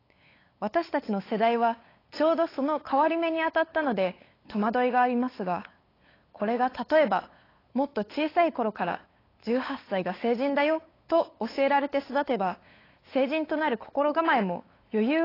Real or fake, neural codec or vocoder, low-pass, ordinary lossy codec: fake; codec, 16 kHz, 8 kbps, FunCodec, trained on LibriTTS, 25 frames a second; 5.4 kHz; AAC, 24 kbps